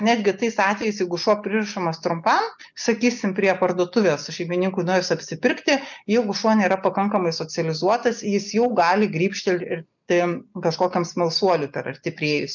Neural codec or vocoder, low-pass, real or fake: none; 7.2 kHz; real